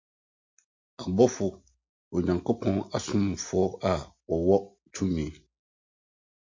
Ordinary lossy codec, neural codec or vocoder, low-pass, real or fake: MP3, 48 kbps; none; 7.2 kHz; real